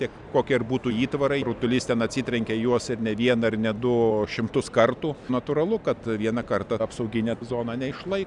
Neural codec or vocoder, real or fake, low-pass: none; real; 10.8 kHz